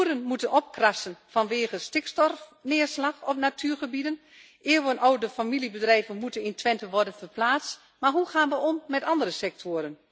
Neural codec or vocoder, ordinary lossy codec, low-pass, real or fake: none; none; none; real